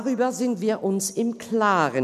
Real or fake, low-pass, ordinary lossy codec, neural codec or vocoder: real; 14.4 kHz; none; none